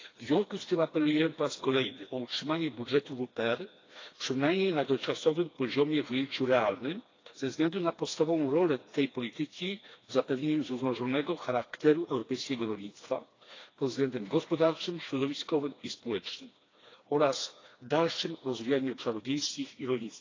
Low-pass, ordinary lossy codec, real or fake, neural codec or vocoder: 7.2 kHz; AAC, 32 kbps; fake; codec, 16 kHz, 2 kbps, FreqCodec, smaller model